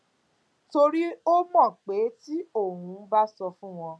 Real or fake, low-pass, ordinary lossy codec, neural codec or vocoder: real; none; none; none